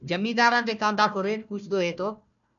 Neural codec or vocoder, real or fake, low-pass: codec, 16 kHz, 1 kbps, FunCodec, trained on Chinese and English, 50 frames a second; fake; 7.2 kHz